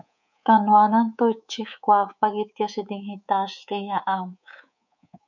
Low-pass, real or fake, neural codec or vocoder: 7.2 kHz; fake; codec, 24 kHz, 3.1 kbps, DualCodec